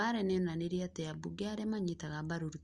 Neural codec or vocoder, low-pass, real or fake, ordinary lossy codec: none; 10.8 kHz; real; Opus, 32 kbps